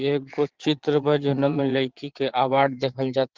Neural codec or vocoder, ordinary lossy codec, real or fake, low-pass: vocoder, 22.05 kHz, 80 mel bands, Vocos; Opus, 16 kbps; fake; 7.2 kHz